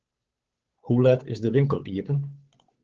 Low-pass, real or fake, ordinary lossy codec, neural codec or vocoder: 7.2 kHz; fake; Opus, 16 kbps; codec, 16 kHz, 8 kbps, FunCodec, trained on Chinese and English, 25 frames a second